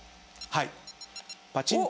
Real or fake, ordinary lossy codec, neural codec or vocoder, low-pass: real; none; none; none